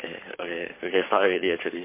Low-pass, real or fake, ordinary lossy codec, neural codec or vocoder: 3.6 kHz; fake; MP3, 24 kbps; codec, 44.1 kHz, 7.8 kbps, Pupu-Codec